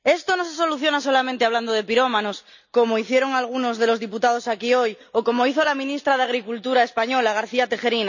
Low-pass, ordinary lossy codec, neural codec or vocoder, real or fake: 7.2 kHz; none; none; real